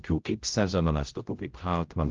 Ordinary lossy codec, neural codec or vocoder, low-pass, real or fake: Opus, 32 kbps; codec, 16 kHz, 0.5 kbps, X-Codec, HuBERT features, trained on general audio; 7.2 kHz; fake